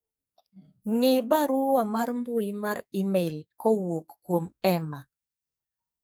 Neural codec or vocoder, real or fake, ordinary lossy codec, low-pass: codec, 44.1 kHz, 2.6 kbps, SNAC; fake; none; none